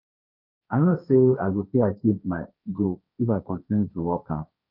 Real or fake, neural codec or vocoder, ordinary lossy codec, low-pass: fake; codec, 16 kHz, 1.1 kbps, Voila-Tokenizer; none; 5.4 kHz